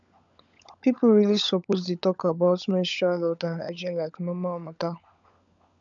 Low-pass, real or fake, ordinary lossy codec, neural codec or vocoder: 7.2 kHz; fake; none; codec, 16 kHz, 16 kbps, FunCodec, trained on LibriTTS, 50 frames a second